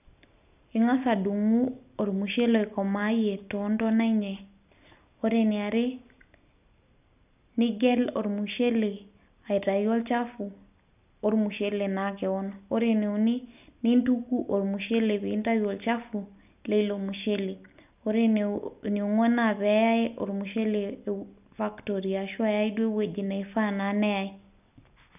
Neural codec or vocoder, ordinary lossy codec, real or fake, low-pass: none; none; real; 3.6 kHz